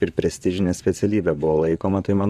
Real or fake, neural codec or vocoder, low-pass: fake; vocoder, 44.1 kHz, 128 mel bands, Pupu-Vocoder; 14.4 kHz